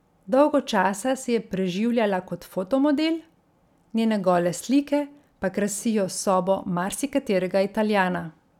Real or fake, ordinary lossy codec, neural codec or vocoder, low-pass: real; none; none; 19.8 kHz